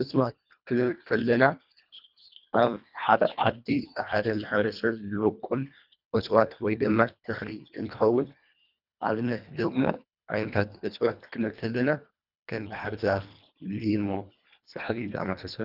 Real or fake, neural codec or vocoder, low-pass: fake; codec, 24 kHz, 1.5 kbps, HILCodec; 5.4 kHz